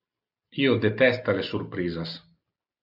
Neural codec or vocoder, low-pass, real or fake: none; 5.4 kHz; real